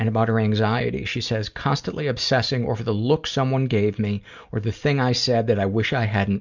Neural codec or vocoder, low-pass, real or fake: none; 7.2 kHz; real